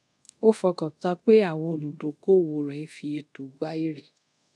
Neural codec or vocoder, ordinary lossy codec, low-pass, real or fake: codec, 24 kHz, 0.5 kbps, DualCodec; none; none; fake